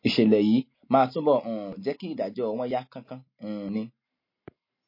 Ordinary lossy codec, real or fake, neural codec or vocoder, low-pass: MP3, 24 kbps; real; none; 5.4 kHz